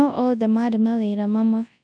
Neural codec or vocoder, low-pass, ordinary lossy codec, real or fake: codec, 24 kHz, 0.9 kbps, WavTokenizer, large speech release; 9.9 kHz; none; fake